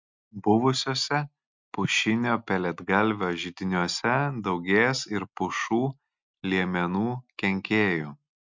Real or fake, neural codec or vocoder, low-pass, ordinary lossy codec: real; none; 7.2 kHz; MP3, 64 kbps